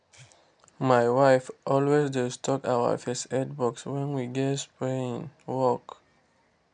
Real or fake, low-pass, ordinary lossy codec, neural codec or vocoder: real; 9.9 kHz; none; none